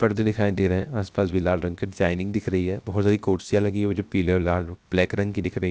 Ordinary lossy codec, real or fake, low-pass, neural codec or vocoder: none; fake; none; codec, 16 kHz, 0.7 kbps, FocalCodec